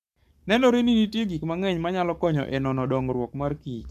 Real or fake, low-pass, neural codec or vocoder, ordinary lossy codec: fake; 14.4 kHz; codec, 44.1 kHz, 7.8 kbps, Pupu-Codec; none